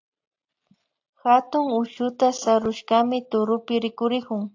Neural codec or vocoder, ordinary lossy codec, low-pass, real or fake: none; AAC, 48 kbps; 7.2 kHz; real